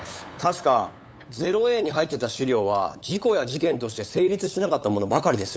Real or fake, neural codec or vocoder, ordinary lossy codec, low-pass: fake; codec, 16 kHz, 8 kbps, FunCodec, trained on LibriTTS, 25 frames a second; none; none